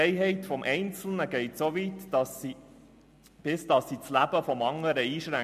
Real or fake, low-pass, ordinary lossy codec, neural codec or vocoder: real; 14.4 kHz; MP3, 64 kbps; none